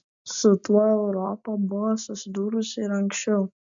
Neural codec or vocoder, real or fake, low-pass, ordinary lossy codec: none; real; 7.2 kHz; MP3, 64 kbps